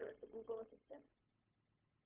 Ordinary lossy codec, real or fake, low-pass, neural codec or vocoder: Opus, 32 kbps; fake; 3.6 kHz; codec, 16 kHz, 0.4 kbps, LongCat-Audio-Codec